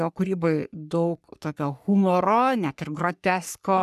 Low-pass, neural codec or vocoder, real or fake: 14.4 kHz; codec, 44.1 kHz, 3.4 kbps, Pupu-Codec; fake